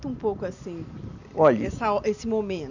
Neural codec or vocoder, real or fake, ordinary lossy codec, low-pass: none; real; none; 7.2 kHz